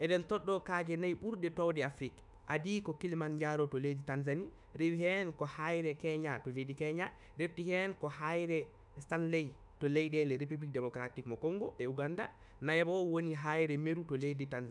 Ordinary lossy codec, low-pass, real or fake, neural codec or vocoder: none; 10.8 kHz; fake; autoencoder, 48 kHz, 32 numbers a frame, DAC-VAE, trained on Japanese speech